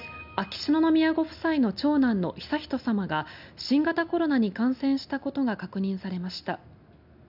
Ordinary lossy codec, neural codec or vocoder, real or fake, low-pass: none; none; real; 5.4 kHz